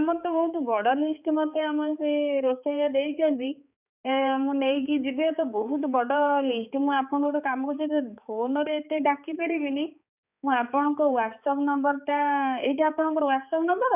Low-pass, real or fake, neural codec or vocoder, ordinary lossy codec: 3.6 kHz; fake; codec, 16 kHz, 4 kbps, X-Codec, HuBERT features, trained on balanced general audio; none